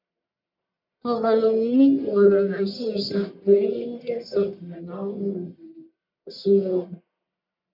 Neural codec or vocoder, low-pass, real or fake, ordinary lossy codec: codec, 44.1 kHz, 1.7 kbps, Pupu-Codec; 5.4 kHz; fake; MP3, 48 kbps